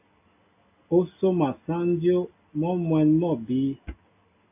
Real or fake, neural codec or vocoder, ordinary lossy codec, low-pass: real; none; AAC, 24 kbps; 3.6 kHz